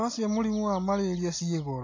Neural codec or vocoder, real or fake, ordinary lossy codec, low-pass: none; real; AAC, 32 kbps; 7.2 kHz